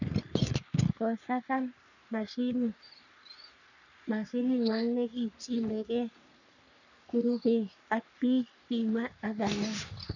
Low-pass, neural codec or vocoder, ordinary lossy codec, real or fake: 7.2 kHz; codec, 44.1 kHz, 3.4 kbps, Pupu-Codec; none; fake